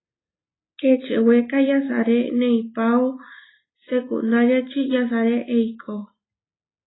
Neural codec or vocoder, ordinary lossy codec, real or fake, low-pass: none; AAC, 16 kbps; real; 7.2 kHz